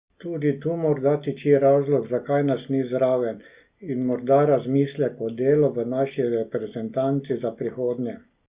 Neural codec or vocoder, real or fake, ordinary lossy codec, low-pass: none; real; Opus, 64 kbps; 3.6 kHz